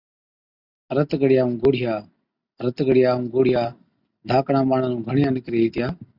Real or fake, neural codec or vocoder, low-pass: real; none; 5.4 kHz